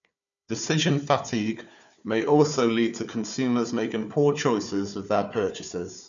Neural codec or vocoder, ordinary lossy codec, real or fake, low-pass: codec, 16 kHz, 4 kbps, FunCodec, trained on Chinese and English, 50 frames a second; none; fake; 7.2 kHz